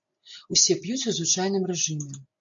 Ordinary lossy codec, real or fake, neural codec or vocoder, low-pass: AAC, 64 kbps; real; none; 7.2 kHz